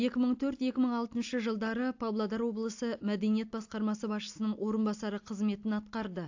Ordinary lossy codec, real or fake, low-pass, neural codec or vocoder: AAC, 48 kbps; real; 7.2 kHz; none